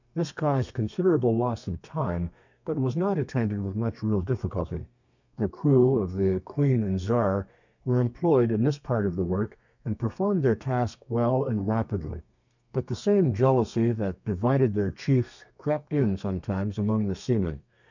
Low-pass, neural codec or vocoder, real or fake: 7.2 kHz; codec, 32 kHz, 1.9 kbps, SNAC; fake